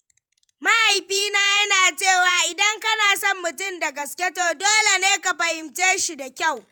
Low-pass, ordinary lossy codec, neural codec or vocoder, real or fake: none; none; vocoder, 48 kHz, 128 mel bands, Vocos; fake